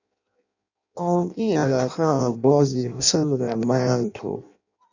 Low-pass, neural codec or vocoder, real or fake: 7.2 kHz; codec, 16 kHz in and 24 kHz out, 0.6 kbps, FireRedTTS-2 codec; fake